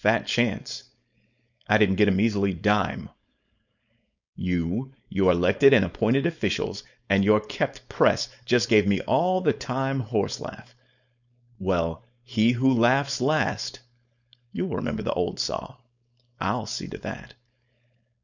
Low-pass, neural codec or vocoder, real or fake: 7.2 kHz; codec, 16 kHz, 4.8 kbps, FACodec; fake